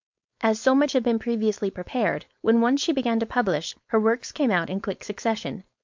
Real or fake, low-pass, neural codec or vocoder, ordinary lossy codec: fake; 7.2 kHz; codec, 16 kHz, 4.8 kbps, FACodec; MP3, 64 kbps